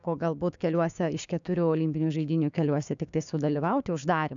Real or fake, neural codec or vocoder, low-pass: fake; codec, 16 kHz, 6 kbps, DAC; 7.2 kHz